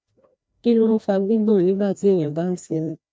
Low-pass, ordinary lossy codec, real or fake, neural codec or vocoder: none; none; fake; codec, 16 kHz, 1 kbps, FreqCodec, larger model